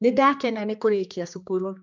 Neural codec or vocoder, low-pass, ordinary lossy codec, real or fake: codec, 16 kHz, 2 kbps, X-Codec, HuBERT features, trained on general audio; 7.2 kHz; MP3, 64 kbps; fake